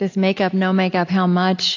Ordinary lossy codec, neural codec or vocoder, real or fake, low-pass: AAC, 48 kbps; none; real; 7.2 kHz